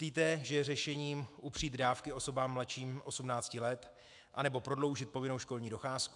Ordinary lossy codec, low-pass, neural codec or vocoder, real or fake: AAC, 64 kbps; 10.8 kHz; autoencoder, 48 kHz, 128 numbers a frame, DAC-VAE, trained on Japanese speech; fake